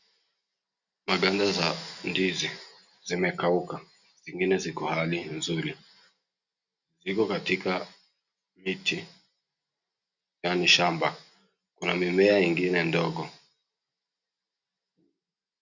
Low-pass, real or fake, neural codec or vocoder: 7.2 kHz; real; none